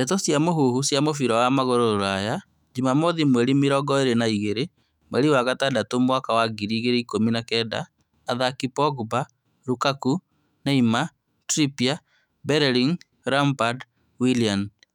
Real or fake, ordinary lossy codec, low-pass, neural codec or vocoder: fake; none; 19.8 kHz; autoencoder, 48 kHz, 128 numbers a frame, DAC-VAE, trained on Japanese speech